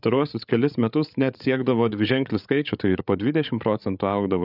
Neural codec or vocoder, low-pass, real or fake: codec, 16 kHz, 8 kbps, FreqCodec, larger model; 5.4 kHz; fake